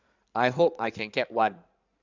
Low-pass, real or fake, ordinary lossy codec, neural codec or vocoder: 7.2 kHz; fake; none; codec, 16 kHz in and 24 kHz out, 2.2 kbps, FireRedTTS-2 codec